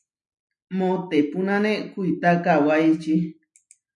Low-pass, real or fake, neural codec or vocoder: 10.8 kHz; real; none